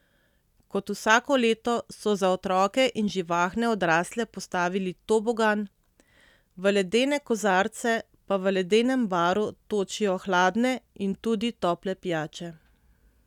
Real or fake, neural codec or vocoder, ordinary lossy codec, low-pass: real; none; none; 19.8 kHz